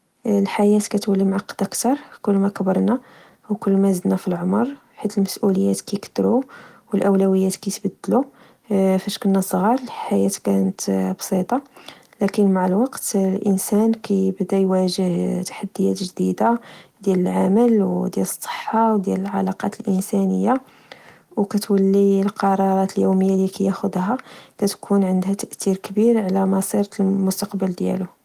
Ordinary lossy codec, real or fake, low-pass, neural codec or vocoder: Opus, 24 kbps; real; 14.4 kHz; none